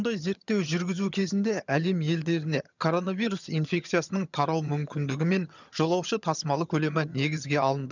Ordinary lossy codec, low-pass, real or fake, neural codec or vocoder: none; 7.2 kHz; fake; vocoder, 22.05 kHz, 80 mel bands, HiFi-GAN